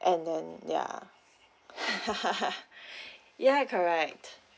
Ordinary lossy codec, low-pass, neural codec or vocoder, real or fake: none; none; none; real